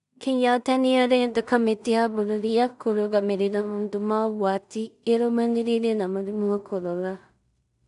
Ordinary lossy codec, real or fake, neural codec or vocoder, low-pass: none; fake; codec, 16 kHz in and 24 kHz out, 0.4 kbps, LongCat-Audio-Codec, two codebook decoder; 10.8 kHz